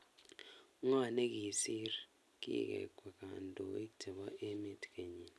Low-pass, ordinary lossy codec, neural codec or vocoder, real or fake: none; none; none; real